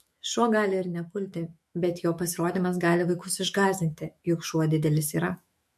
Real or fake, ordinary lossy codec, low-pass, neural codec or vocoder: fake; MP3, 64 kbps; 14.4 kHz; autoencoder, 48 kHz, 128 numbers a frame, DAC-VAE, trained on Japanese speech